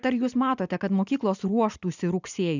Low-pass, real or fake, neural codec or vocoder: 7.2 kHz; real; none